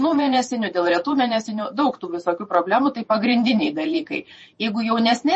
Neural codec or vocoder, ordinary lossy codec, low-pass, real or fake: vocoder, 48 kHz, 128 mel bands, Vocos; MP3, 32 kbps; 10.8 kHz; fake